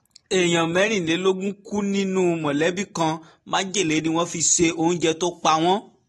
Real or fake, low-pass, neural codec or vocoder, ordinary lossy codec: real; 19.8 kHz; none; AAC, 32 kbps